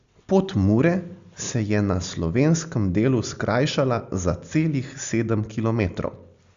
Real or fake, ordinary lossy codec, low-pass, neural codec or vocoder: real; Opus, 64 kbps; 7.2 kHz; none